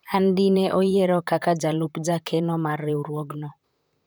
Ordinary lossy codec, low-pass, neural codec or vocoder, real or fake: none; none; vocoder, 44.1 kHz, 128 mel bands, Pupu-Vocoder; fake